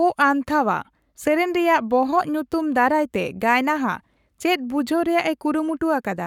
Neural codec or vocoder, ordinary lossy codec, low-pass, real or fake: codec, 44.1 kHz, 7.8 kbps, Pupu-Codec; none; 19.8 kHz; fake